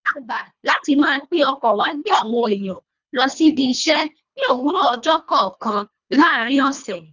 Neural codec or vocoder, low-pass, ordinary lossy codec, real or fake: codec, 24 kHz, 1.5 kbps, HILCodec; 7.2 kHz; none; fake